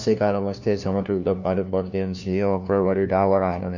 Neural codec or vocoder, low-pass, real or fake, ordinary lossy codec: codec, 16 kHz, 1 kbps, FunCodec, trained on LibriTTS, 50 frames a second; 7.2 kHz; fake; none